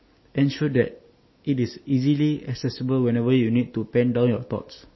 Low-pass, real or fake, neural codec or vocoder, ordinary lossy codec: 7.2 kHz; real; none; MP3, 24 kbps